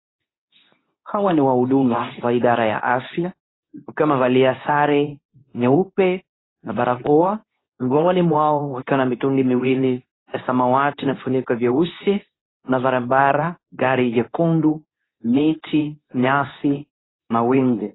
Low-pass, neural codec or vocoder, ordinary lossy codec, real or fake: 7.2 kHz; codec, 24 kHz, 0.9 kbps, WavTokenizer, medium speech release version 2; AAC, 16 kbps; fake